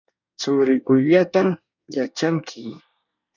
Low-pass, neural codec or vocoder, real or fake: 7.2 kHz; codec, 24 kHz, 1 kbps, SNAC; fake